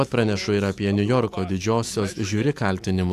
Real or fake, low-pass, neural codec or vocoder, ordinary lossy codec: fake; 14.4 kHz; autoencoder, 48 kHz, 128 numbers a frame, DAC-VAE, trained on Japanese speech; AAC, 64 kbps